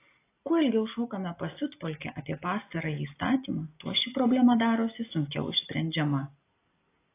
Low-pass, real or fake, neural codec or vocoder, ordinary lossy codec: 3.6 kHz; real; none; AAC, 24 kbps